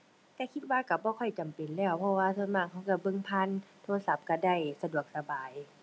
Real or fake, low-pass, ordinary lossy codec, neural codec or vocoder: real; none; none; none